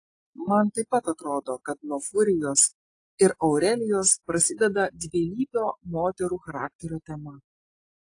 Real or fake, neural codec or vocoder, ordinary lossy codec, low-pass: real; none; AAC, 48 kbps; 9.9 kHz